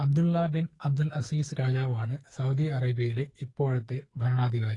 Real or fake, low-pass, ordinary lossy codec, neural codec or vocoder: fake; 10.8 kHz; AAC, 32 kbps; codec, 44.1 kHz, 2.6 kbps, SNAC